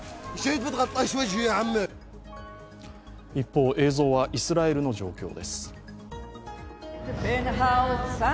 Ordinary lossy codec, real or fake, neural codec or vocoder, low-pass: none; real; none; none